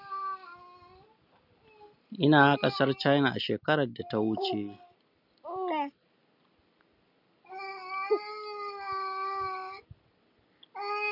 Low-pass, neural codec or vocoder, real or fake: 5.4 kHz; none; real